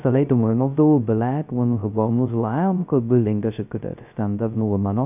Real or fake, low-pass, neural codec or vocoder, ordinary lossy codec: fake; 3.6 kHz; codec, 16 kHz, 0.2 kbps, FocalCodec; none